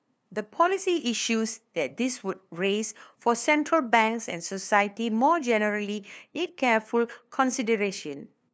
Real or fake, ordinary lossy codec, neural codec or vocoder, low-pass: fake; none; codec, 16 kHz, 2 kbps, FunCodec, trained on LibriTTS, 25 frames a second; none